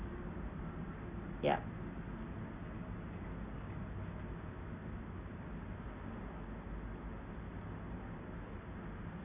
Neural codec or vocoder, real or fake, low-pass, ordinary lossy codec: none; real; 3.6 kHz; Opus, 64 kbps